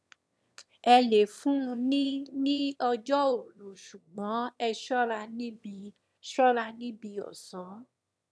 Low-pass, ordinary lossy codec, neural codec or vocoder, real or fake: none; none; autoencoder, 22.05 kHz, a latent of 192 numbers a frame, VITS, trained on one speaker; fake